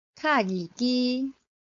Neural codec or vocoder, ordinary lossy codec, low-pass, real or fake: codec, 16 kHz, 4.8 kbps, FACodec; AAC, 48 kbps; 7.2 kHz; fake